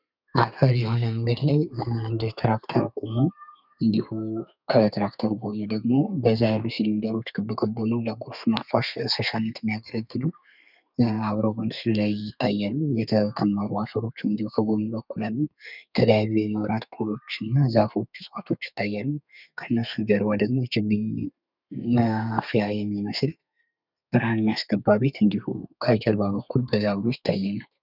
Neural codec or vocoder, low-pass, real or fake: codec, 32 kHz, 1.9 kbps, SNAC; 5.4 kHz; fake